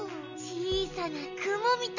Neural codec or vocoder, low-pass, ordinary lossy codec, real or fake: none; 7.2 kHz; none; real